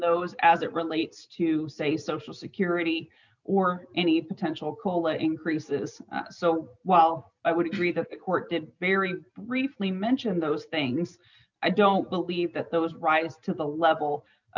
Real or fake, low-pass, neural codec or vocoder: real; 7.2 kHz; none